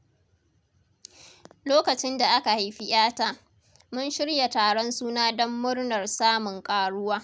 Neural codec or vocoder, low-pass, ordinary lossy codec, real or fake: none; none; none; real